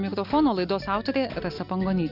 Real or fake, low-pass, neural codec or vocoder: real; 5.4 kHz; none